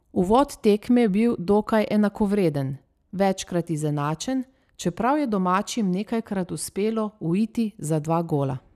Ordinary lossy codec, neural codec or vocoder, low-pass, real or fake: none; none; 14.4 kHz; real